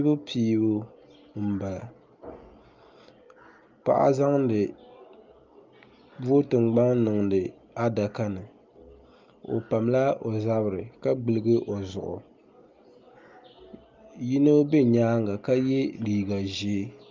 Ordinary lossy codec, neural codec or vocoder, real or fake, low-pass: Opus, 24 kbps; none; real; 7.2 kHz